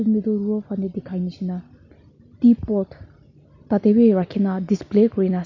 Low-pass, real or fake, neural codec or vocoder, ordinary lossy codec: 7.2 kHz; real; none; none